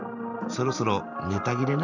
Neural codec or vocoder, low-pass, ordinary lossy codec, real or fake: vocoder, 44.1 kHz, 128 mel bands every 512 samples, BigVGAN v2; 7.2 kHz; none; fake